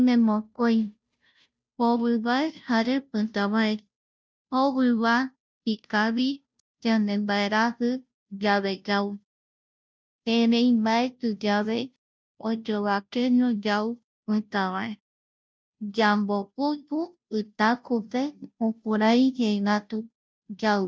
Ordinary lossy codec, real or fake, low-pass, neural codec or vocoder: none; fake; none; codec, 16 kHz, 0.5 kbps, FunCodec, trained on Chinese and English, 25 frames a second